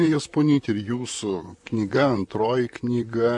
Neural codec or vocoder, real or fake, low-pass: vocoder, 44.1 kHz, 128 mel bands, Pupu-Vocoder; fake; 10.8 kHz